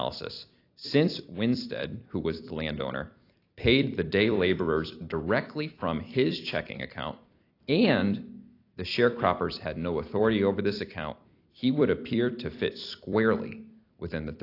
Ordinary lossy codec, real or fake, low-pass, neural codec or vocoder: AAC, 32 kbps; fake; 5.4 kHz; vocoder, 44.1 kHz, 128 mel bands every 256 samples, BigVGAN v2